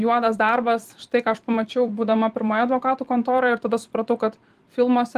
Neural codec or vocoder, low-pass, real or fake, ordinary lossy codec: vocoder, 44.1 kHz, 128 mel bands every 256 samples, BigVGAN v2; 14.4 kHz; fake; Opus, 24 kbps